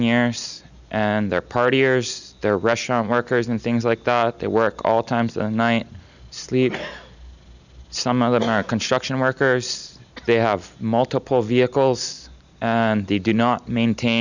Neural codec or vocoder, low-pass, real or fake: none; 7.2 kHz; real